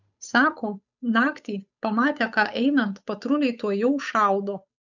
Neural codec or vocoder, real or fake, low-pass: codec, 16 kHz, 8 kbps, FunCodec, trained on Chinese and English, 25 frames a second; fake; 7.2 kHz